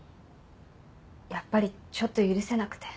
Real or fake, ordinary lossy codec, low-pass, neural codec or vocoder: real; none; none; none